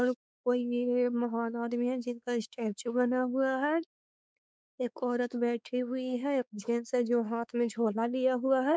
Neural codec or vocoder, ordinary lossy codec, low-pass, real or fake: codec, 16 kHz, 4 kbps, X-Codec, WavLM features, trained on Multilingual LibriSpeech; none; none; fake